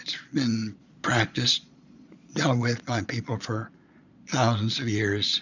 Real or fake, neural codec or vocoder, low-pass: fake; vocoder, 22.05 kHz, 80 mel bands, Vocos; 7.2 kHz